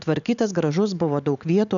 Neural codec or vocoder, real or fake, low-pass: none; real; 7.2 kHz